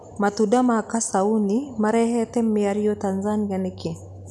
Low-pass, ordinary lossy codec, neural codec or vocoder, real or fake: none; none; none; real